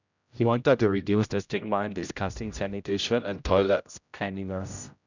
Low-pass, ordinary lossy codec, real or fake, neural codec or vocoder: 7.2 kHz; none; fake; codec, 16 kHz, 0.5 kbps, X-Codec, HuBERT features, trained on general audio